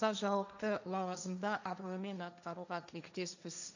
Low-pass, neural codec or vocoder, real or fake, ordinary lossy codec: 7.2 kHz; codec, 16 kHz, 1.1 kbps, Voila-Tokenizer; fake; none